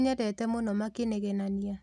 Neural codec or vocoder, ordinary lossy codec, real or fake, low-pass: none; none; real; none